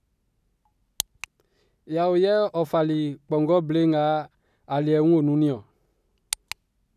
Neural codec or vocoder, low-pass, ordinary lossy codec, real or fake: none; 14.4 kHz; none; real